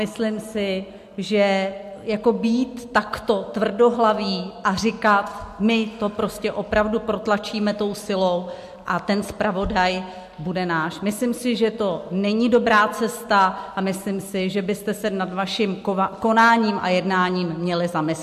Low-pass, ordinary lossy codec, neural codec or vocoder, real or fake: 14.4 kHz; MP3, 64 kbps; none; real